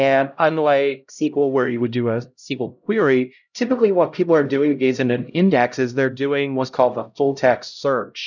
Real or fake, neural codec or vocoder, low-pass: fake; codec, 16 kHz, 0.5 kbps, X-Codec, HuBERT features, trained on LibriSpeech; 7.2 kHz